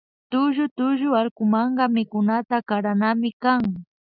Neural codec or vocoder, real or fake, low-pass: none; real; 5.4 kHz